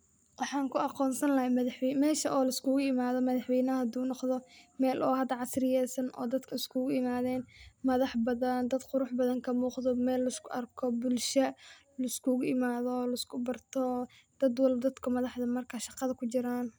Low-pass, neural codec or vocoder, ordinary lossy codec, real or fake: none; none; none; real